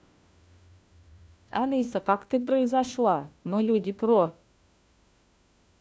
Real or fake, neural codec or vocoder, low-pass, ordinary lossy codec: fake; codec, 16 kHz, 1 kbps, FunCodec, trained on LibriTTS, 50 frames a second; none; none